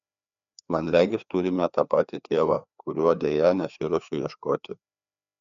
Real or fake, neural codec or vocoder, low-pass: fake; codec, 16 kHz, 2 kbps, FreqCodec, larger model; 7.2 kHz